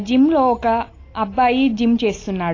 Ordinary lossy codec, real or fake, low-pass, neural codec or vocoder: AAC, 32 kbps; real; 7.2 kHz; none